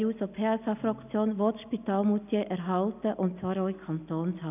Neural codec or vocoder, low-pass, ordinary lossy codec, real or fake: none; 3.6 kHz; none; real